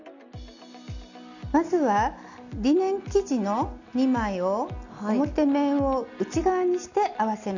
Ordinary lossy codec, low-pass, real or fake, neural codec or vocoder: none; 7.2 kHz; real; none